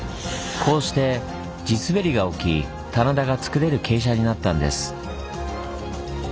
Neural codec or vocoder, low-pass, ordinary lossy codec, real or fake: none; none; none; real